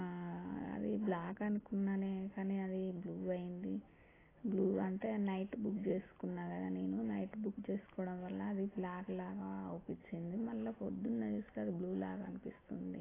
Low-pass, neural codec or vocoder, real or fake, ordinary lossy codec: 3.6 kHz; none; real; AAC, 16 kbps